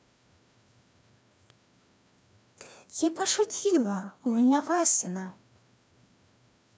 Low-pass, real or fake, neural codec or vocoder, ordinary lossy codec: none; fake; codec, 16 kHz, 1 kbps, FreqCodec, larger model; none